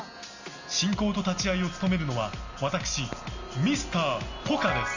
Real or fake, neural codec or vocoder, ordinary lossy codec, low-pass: real; none; Opus, 64 kbps; 7.2 kHz